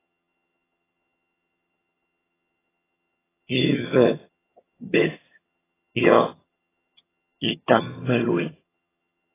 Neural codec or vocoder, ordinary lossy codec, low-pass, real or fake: vocoder, 22.05 kHz, 80 mel bands, HiFi-GAN; AAC, 16 kbps; 3.6 kHz; fake